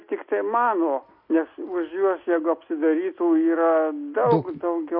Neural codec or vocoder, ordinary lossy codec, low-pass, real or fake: none; MP3, 32 kbps; 5.4 kHz; real